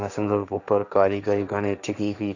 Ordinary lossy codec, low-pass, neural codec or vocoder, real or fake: none; 7.2 kHz; codec, 16 kHz, 1.1 kbps, Voila-Tokenizer; fake